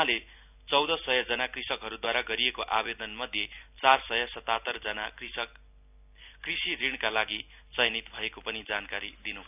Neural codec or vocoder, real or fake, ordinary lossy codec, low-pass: none; real; none; 3.6 kHz